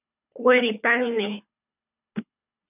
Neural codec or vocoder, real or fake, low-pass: codec, 24 kHz, 3 kbps, HILCodec; fake; 3.6 kHz